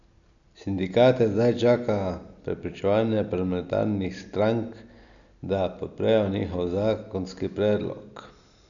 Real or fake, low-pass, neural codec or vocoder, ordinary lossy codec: real; 7.2 kHz; none; none